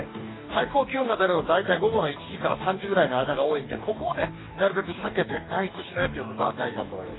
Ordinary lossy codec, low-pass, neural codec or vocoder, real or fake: AAC, 16 kbps; 7.2 kHz; codec, 44.1 kHz, 2.6 kbps, DAC; fake